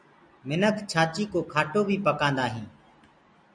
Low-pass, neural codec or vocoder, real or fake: 9.9 kHz; none; real